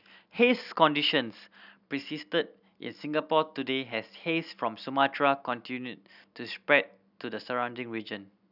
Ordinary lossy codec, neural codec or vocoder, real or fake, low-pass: none; none; real; 5.4 kHz